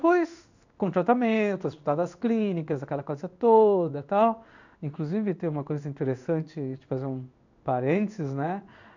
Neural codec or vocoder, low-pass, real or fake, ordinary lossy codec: codec, 16 kHz in and 24 kHz out, 1 kbps, XY-Tokenizer; 7.2 kHz; fake; none